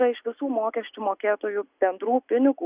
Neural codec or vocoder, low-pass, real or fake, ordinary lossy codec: none; 3.6 kHz; real; Opus, 64 kbps